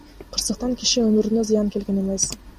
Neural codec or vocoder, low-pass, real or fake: none; 14.4 kHz; real